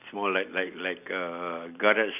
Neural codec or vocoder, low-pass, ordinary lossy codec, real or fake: none; 3.6 kHz; none; real